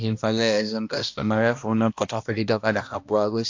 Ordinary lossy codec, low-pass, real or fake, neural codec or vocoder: AAC, 48 kbps; 7.2 kHz; fake; codec, 16 kHz, 1 kbps, X-Codec, HuBERT features, trained on balanced general audio